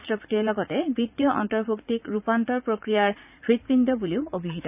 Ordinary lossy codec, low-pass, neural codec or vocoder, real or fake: none; 3.6 kHz; vocoder, 44.1 kHz, 80 mel bands, Vocos; fake